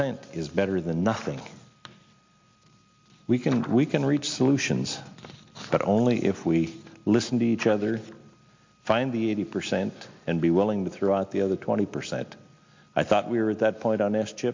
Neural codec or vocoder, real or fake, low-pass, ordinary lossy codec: none; real; 7.2 kHz; MP3, 48 kbps